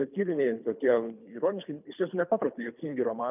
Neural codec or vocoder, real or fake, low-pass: codec, 24 kHz, 3 kbps, HILCodec; fake; 3.6 kHz